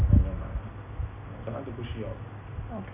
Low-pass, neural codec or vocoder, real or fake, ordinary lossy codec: 3.6 kHz; none; real; none